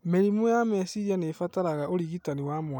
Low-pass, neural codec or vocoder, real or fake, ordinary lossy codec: 19.8 kHz; none; real; none